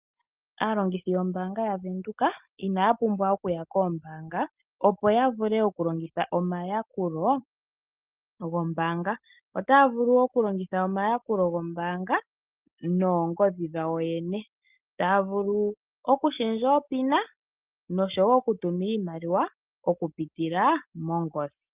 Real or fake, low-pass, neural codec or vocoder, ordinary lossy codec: real; 3.6 kHz; none; Opus, 24 kbps